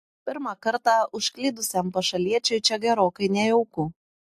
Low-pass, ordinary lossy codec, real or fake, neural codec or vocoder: 14.4 kHz; AAC, 64 kbps; real; none